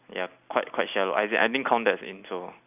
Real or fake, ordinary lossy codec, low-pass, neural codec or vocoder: fake; none; 3.6 kHz; vocoder, 44.1 kHz, 128 mel bands every 256 samples, BigVGAN v2